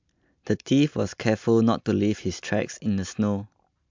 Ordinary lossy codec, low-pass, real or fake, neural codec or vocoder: MP3, 64 kbps; 7.2 kHz; real; none